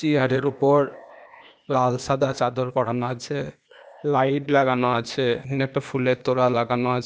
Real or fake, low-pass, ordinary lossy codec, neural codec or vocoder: fake; none; none; codec, 16 kHz, 0.8 kbps, ZipCodec